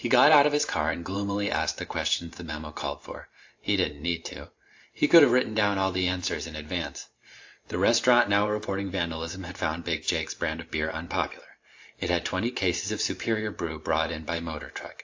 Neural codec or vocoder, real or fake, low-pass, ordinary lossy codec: vocoder, 44.1 kHz, 128 mel bands every 512 samples, BigVGAN v2; fake; 7.2 kHz; AAC, 48 kbps